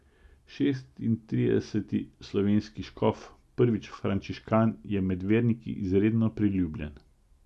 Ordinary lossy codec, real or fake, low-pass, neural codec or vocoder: none; real; none; none